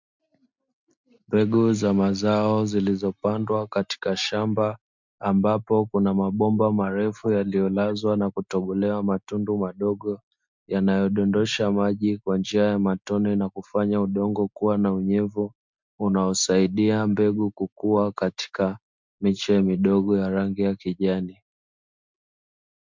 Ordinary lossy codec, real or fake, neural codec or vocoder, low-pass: MP3, 64 kbps; real; none; 7.2 kHz